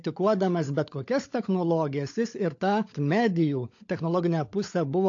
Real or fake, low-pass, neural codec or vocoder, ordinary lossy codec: fake; 7.2 kHz; codec, 16 kHz, 16 kbps, FunCodec, trained on LibriTTS, 50 frames a second; AAC, 48 kbps